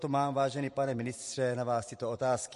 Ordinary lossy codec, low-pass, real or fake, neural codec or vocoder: MP3, 48 kbps; 14.4 kHz; fake; vocoder, 44.1 kHz, 128 mel bands every 512 samples, BigVGAN v2